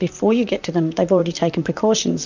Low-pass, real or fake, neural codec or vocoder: 7.2 kHz; fake; vocoder, 44.1 kHz, 128 mel bands, Pupu-Vocoder